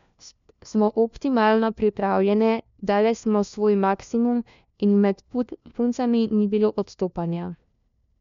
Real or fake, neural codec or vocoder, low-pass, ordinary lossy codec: fake; codec, 16 kHz, 1 kbps, FunCodec, trained on LibriTTS, 50 frames a second; 7.2 kHz; MP3, 64 kbps